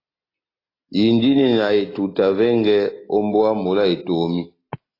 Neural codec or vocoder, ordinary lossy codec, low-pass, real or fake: none; AAC, 24 kbps; 5.4 kHz; real